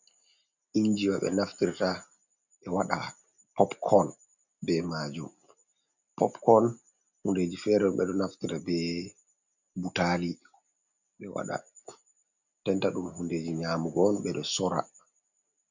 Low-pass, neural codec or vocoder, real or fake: 7.2 kHz; none; real